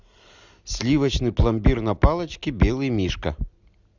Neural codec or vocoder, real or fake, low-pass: none; real; 7.2 kHz